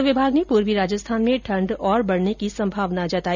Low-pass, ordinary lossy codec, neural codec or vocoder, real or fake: 7.2 kHz; none; none; real